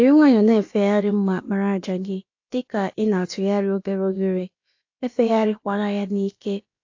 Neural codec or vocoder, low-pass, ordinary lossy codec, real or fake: codec, 16 kHz, about 1 kbps, DyCAST, with the encoder's durations; 7.2 kHz; AAC, 48 kbps; fake